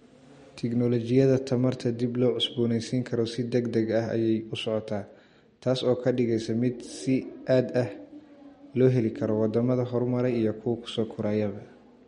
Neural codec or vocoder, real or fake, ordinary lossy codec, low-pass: none; real; MP3, 48 kbps; 10.8 kHz